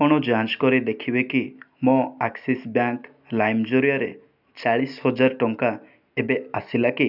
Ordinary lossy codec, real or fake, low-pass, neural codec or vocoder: none; real; 5.4 kHz; none